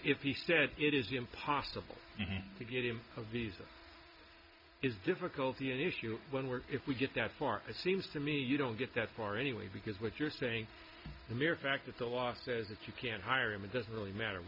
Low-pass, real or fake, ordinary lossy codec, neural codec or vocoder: 5.4 kHz; real; MP3, 48 kbps; none